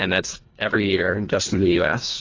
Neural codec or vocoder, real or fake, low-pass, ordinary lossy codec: codec, 24 kHz, 1.5 kbps, HILCodec; fake; 7.2 kHz; AAC, 32 kbps